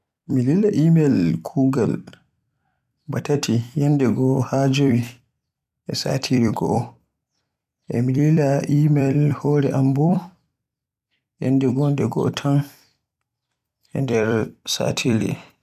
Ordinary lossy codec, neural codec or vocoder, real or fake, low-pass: none; vocoder, 44.1 kHz, 128 mel bands every 256 samples, BigVGAN v2; fake; 14.4 kHz